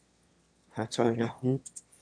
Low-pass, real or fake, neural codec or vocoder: 9.9 kHz; fake; autoencoder, 22.05 kHz, a latent of 192 numbers a frame, VITS, trained on one speaker